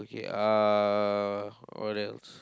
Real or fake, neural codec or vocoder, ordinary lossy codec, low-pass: real; none; none; none